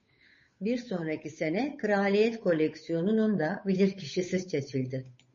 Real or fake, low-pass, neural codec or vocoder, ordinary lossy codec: fake; 7.2 kHz; codec, 16 kHz, 8 kbps, FunCodec, trained on Chinese and English, 25 frames a second; MP3, 32 kbps